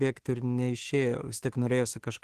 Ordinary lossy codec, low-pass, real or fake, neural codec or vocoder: Opus, 16 kbps; 14.4 kHz; fake; autoencoder, 48 kHz, 32 numbers a frame, DAC-VAE, trained on Japanese speech